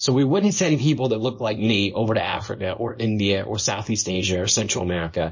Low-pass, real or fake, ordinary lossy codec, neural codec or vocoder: 7.2 kHz; fake; MP3, 32 kbps; codec, 24 kHz, 0.9 kbps, WavTokenizer, small release